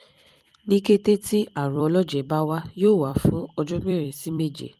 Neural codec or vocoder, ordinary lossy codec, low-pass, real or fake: vocoder, 44.1 kHz, 128 mel bands every 256 samples, BigVGAN v2; Opus, 32 kbps; 14.4 kHz; fake